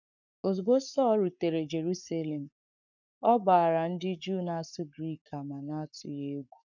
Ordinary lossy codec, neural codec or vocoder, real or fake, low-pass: none; codec, 44.1 kHz, 7.8 kbps, Pupu-Codec; fake; 7.2 kHz